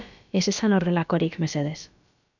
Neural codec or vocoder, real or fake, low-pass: codec, 16 kHz, about 1 kbps, DyCAST, with the encoder's durations; fake; 7.2 kHz